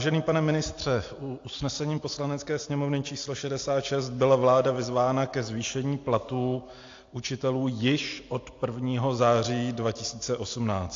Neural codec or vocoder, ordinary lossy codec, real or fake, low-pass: none; AAC, 48 kbps; real; 7.2 kHz